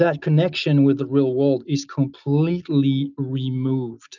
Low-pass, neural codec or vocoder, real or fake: 7.2 kHz; none; real